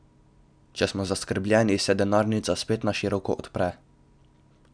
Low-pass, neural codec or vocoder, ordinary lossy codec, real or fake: 9.9 kHz; none; none; real